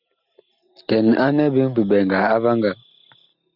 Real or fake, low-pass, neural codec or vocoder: real; 5.4 kHz; none